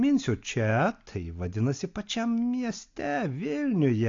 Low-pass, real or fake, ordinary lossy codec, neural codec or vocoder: 7.2 kHz; real; AAC, 48 kbps; none